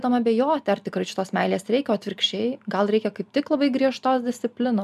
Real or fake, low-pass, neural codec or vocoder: real; 14.4 kHz; none